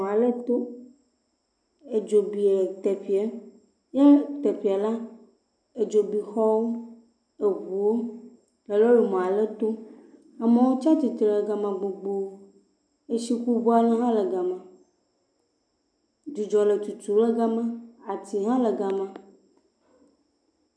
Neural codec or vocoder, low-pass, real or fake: none; 9.9 kHz; real